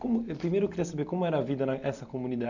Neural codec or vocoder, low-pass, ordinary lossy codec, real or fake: none; 7.2 kHz; none; real